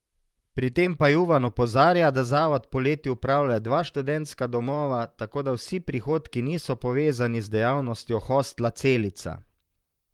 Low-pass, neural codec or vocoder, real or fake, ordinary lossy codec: 19.8 kHz; vocoder, 44.1 kHz, 128 mel bands, Pupu-Vocoder; fake; Opus, 32 kbps